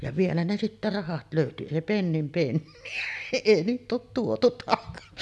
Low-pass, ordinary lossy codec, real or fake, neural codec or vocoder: none; none; real; none